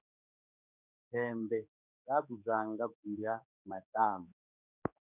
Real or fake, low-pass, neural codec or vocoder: fake; 3.6 kHz; codec, 16 kHz, 4 kbps, X-Codec, HuBERT features, trained on general audio